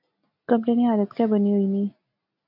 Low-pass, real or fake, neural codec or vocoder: 5.4 kHz; real; none